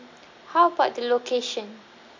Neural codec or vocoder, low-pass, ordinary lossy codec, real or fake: none; 7.2 kHz; MP3, 64 kbps; real